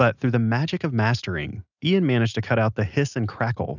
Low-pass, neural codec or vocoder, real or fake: 7.2 kHz; none; real